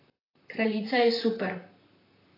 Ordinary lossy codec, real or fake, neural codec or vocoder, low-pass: AAC, 24 kbps; fake; vocoder, 44.1 kHz, 128 mel bands, Pupu-Vocoder; 5.4 kHz